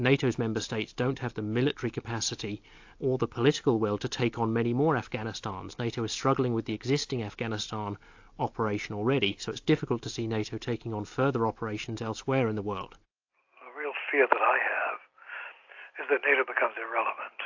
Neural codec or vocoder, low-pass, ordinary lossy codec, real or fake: none; 7.2 kHz; AAC, 48 kbps; real